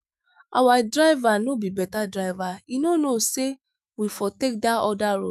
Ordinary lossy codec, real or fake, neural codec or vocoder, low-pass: none; fake; autoencoder, 48 kHz, 128 numbers a frame, DAC-VAE, trained on Japanese speech; 14.4 kHz